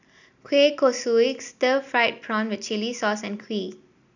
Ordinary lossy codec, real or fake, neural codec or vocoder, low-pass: none; real; none; 7.2 kHz